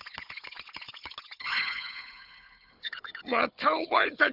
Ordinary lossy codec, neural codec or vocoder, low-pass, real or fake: none; codec, 16 kHz, 4 kbps, FunCodec, trained on Chinese and English, 50 frames a second; 5.4 kHz; fake